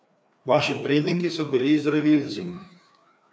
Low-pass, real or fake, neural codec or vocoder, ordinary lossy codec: none; fake; codec, 16 kHz, 2 kbps, FreqCodec, larger model; none